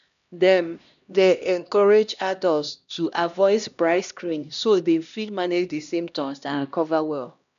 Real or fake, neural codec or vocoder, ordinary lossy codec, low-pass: fake; codec, 16 kHz, 1 kbps, X-Codec, HuBERT features, trained on LibriSpeech; none; 7.2 kHz